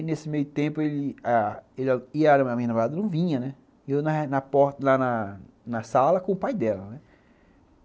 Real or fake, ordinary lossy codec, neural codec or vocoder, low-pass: real; none; none; none